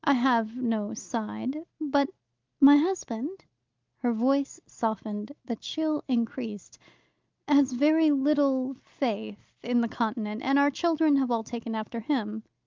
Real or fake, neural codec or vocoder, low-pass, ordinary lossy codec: real; none; 7.2 kHz; Opus, 24 kbps